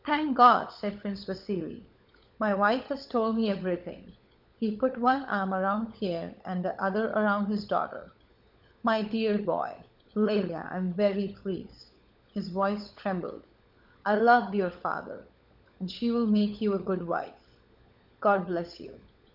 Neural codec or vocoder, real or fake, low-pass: codec, 16 kHz, 16 kbps, FunCodec, trained on LibriTTS, 50 frames a second; fake; 5.4 kHz